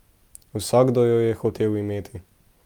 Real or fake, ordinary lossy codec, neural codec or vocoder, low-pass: real; Opus, 32 kbps; none; 19.8 kHz